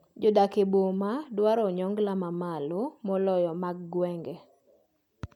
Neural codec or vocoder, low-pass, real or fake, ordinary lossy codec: none; 19.8 kHz; real; none